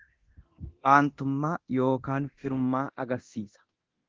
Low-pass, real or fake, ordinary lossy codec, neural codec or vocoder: 7.2 kHz; fake; Opus, 16 kbps; codec, 24 kHz, 0.9 kbps, DualCodec